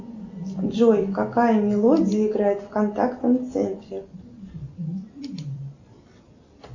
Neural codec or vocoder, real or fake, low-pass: none; real; 7.2 kHz